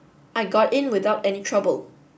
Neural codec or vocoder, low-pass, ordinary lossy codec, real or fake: none; none; none; real